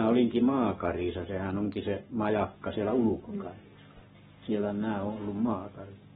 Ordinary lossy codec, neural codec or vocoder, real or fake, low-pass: AAC, 16 kbps; codec, 44.1 kHz, 7.8 kbps, Pupu-Codec; fake; 19.8 kHz